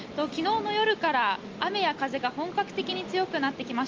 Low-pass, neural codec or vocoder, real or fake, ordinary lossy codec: 7.2 kHz; none; real; Opus, 24 kbps